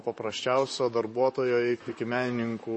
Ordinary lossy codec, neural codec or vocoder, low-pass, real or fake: MP3, 32 kbps; vocoder, 44.1 kHz, 128 mel bands, Pupu-Vocoder; 10.8 kHz; fake